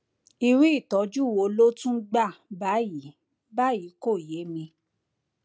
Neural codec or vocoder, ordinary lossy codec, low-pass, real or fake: none; none; none; real